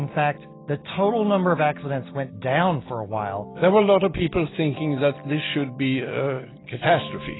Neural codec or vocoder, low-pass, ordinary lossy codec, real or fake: none; 7.2 kHz; AAC, 16 kbps; real